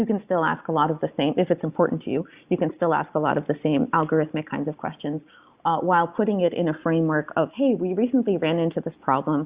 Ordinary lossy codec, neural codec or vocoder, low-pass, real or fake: Opus, 64 kbps; none; 3.6 kHz; real